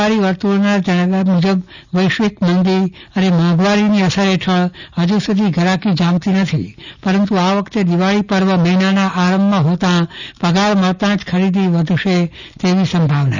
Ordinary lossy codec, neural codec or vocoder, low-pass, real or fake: none; none; 7.2 kHz; real